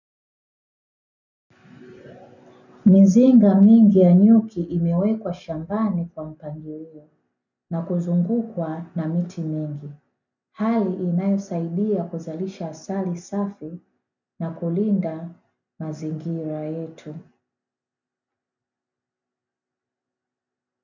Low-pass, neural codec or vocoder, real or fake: 7.2 kHz; none; real